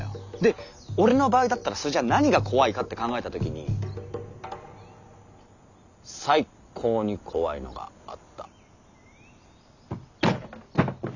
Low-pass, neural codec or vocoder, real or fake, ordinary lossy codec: 7.2 kHz; none; real; none